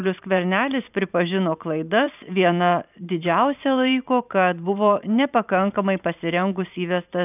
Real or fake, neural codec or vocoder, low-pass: fake; vocoder, 44.1 kHz, 128 mel bands every 512 samples, BigVGAN v2; 3.6 kHz